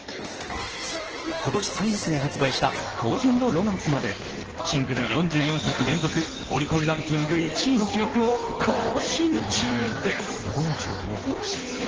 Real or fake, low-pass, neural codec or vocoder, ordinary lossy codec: fake; 7.2 kHz; codec, 16 kHz in and 24 kHz out, 1.1 kbps, FireRedTTS-2 codec; Opus, 16 kbps